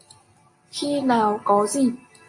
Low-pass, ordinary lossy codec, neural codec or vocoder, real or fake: 10.8 kHz; MP3, 48 kbps; none; real